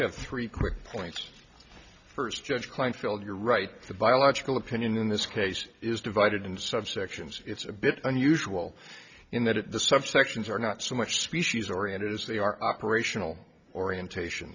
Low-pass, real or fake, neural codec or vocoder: 7.2 kHz; real; none